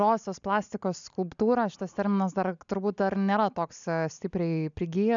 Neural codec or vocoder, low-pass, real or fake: none; 7.2 kHz; real